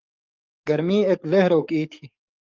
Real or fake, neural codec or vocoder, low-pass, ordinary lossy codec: fake; codec, 44.1 kHz, 7.8 kbps, DAC; 7.2 kHz; Opus, 32 kbps